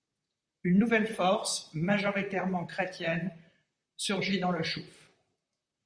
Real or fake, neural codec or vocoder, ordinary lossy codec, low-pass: fake; vocoder, 44.1 kHz, 128 mel bands, Pupu-Vocoder; Opus, 64 kbps; 9.9 kHz